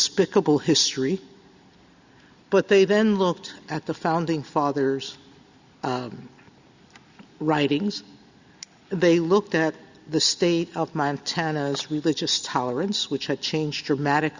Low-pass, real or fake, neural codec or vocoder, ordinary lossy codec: 7.2 kHz; real; none; Opus, 64 kbps